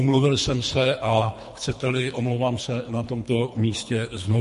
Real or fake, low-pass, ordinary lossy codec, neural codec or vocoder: fake; 10.8 kHz; MP3, 48 kbps; codec, 24 kHz, 3 kbps, HILCodec